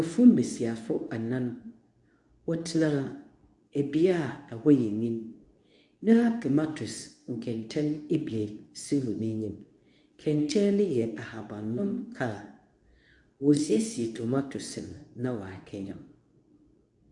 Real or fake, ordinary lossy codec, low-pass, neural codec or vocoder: fake; MP3, 96 kbps; 10.8 kHz; codec, 24 kHz, 0.9 kbps, WavTokenizer, medium speech release version 2